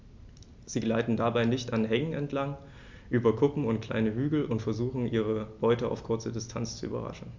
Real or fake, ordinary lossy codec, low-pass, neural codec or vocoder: real; MP3, 64 kbps; 7.2 kHz; none